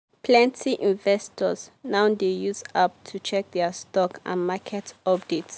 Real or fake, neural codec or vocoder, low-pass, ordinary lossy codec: real; none; none; none